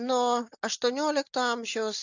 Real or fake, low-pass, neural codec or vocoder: real; 7.2 kHz; none